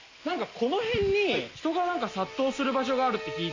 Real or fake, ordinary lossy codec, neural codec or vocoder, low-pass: real; MP3, 64 kbps; none; 7.2 kHz